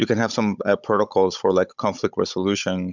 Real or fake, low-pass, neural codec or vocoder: fake; 7.2 kHz; codec, 16 kHz, 16 kbps, FunCodec, trained on Chinese and English, 50 frames a second